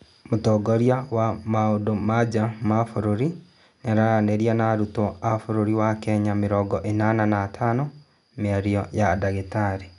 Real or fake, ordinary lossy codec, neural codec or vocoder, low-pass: real; none; none; 10.8 kHz